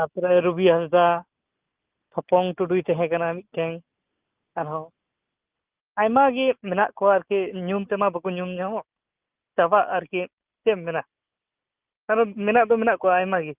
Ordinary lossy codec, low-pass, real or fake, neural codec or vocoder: Opus, 32 kbps; 3.6 kHz; real; none